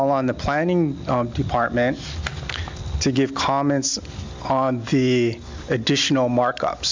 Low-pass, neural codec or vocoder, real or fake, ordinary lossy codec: 7.2 kHz; none; real; MP3, 64 kbps